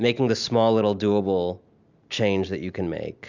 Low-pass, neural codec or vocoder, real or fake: 7.2 kHz; none; real